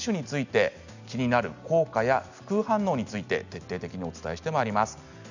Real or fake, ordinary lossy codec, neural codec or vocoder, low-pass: real; none; none; 7.2 kHz